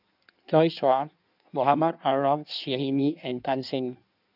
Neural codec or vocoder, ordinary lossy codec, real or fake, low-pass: codec, 16 kHz in and 24 kHz out, 1.1 kbps, FireRedTTS-2 codec; none; fake; 5.4 kHz